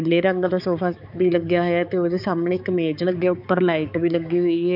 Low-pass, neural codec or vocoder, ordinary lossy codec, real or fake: 5.4 kHz; codec, 16 kHz, 4 kbps, X-Codec, HuBERT features, trained on balanced general audio; none; fake